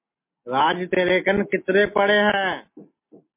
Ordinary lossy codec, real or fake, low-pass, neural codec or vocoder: MP3, 24 kbps; real; 3.6 kHz; none